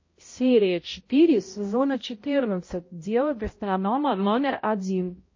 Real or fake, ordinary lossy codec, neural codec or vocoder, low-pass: fake; MP3, 32 kbps; codec, 16 kHz, 0.5 kbps, X-Codec, HuBERT features, trained on balanced general audio; 7.2 kHz